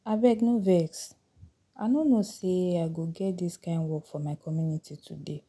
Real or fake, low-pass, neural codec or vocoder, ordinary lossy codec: real; none; none; none